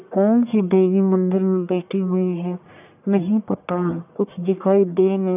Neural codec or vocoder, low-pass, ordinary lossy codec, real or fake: codec, 44.1 kHz, 1.7 kbps, Pupu-Codec; 3.6 kHz; none; fake